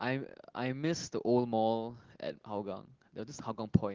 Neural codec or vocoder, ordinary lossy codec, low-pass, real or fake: none; Opus, 16 kbps; 7.2 kHz; real